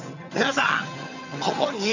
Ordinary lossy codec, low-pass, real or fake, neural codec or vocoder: none; 7.2 kHz; fake; vocoder, 22.05 kHz, 80 mel bands, HiFi-GAN